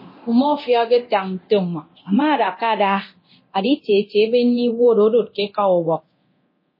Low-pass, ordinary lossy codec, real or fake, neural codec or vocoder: 5.4 kHz; MP3, 24 kbps; fake; codec, 24 kHz, 0.9 kbps, DualCodec